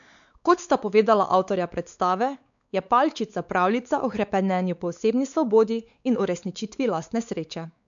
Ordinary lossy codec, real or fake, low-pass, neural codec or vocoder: none; fake; 7.2 kHz; codec, 16 kHz, 4 kbps, X-Codec, WavLM features, trained on Multilingual LibriSpeech